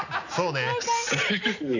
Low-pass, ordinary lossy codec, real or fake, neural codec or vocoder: 7.2 kHz; none; real; none